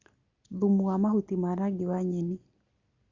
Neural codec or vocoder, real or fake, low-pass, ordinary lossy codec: none; real; 7.2 kHz; Opus, 64 kbps